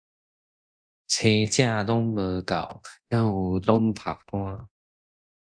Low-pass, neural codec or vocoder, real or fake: 9.9 kHz; codec, 24 kHz, 0.9 kbps, DualCodec; fake